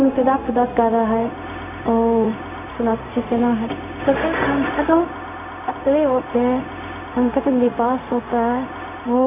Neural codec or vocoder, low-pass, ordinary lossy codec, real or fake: codec, 16 kHz, 0.4 kbps, LongCat-Audio-Codec; 3.6 kHz; none; fake